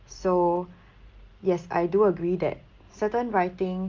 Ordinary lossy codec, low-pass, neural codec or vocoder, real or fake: Opus, 32 kbps; 7.2 kHz; none; real